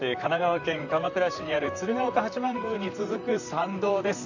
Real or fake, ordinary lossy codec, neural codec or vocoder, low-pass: fake; none; vocoder, 44.1 kHz, 128 mel bands, Pupu-Vocoder; 7.2 kHz